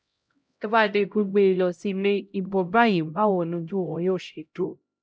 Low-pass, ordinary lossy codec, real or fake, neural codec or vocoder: none; none; fake; codec, 16 kHz, 0.5 kbps, X-Codec, HuBERT features, trained on LibriSpeech